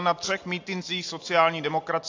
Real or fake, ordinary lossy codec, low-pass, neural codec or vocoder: real; AAC, 48 kbps; 7.2 kHz; none